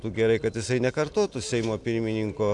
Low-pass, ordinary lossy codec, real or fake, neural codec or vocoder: 10.8 kHz; MP3, 64 kbps; real; none